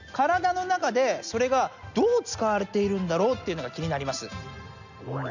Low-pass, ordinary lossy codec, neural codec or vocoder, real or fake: 7.2 kHz; none; none; real